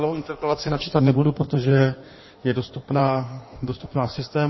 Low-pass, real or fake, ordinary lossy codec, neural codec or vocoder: 7.2 kHz; fake; MP3, 24 kbps; codec, 16 kHz in and 24 kHz out, 1.1 kbps, FireRedTTS-2 codec